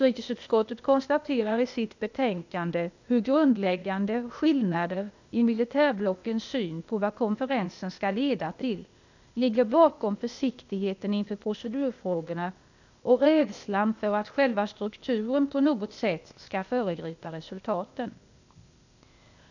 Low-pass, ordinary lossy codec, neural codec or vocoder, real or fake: 7.2 kHz; none; codec, 16 kHz, 0.8 kbps, ZipCodec; fake